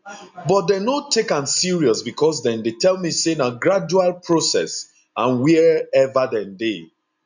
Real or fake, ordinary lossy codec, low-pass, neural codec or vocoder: real; none; 7.2 kHz; none